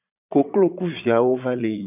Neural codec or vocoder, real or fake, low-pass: vocoder, 22.05 kHz, 80 mel bands, Vocos; fake; 3.6 kHz